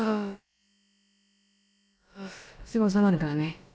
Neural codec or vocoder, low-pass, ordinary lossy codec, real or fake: codec, 16 kHz, about 1 kbps, DyCAST, with the encoder's durations; none; none; fake